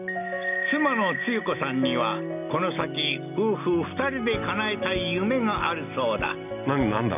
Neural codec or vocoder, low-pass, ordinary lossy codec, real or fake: none; 3.6 kHz; none; real